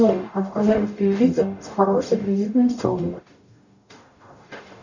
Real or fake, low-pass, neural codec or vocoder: fake; 7.2 kHz; codec, 44.1 kHz, 0.9 kbps, DAC